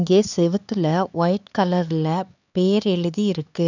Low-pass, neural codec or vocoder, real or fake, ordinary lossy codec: 7.2 kHz; codec, 16 kHz, 4 kbps, X-Codec, HuBERT features, trained on LibriSpeech; fake; none